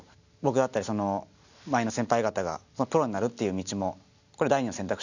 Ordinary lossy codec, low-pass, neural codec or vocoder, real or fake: none; 7.2 kHz; none; real